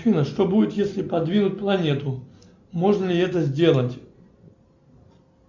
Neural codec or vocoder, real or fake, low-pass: none; real; 7.2 kHz